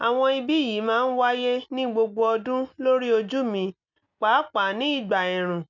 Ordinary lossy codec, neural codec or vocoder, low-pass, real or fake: none; none; 7.2 kHz; real